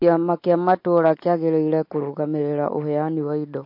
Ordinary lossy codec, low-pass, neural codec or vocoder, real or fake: MP3, 32 kbps; 5.4 kHz; none; real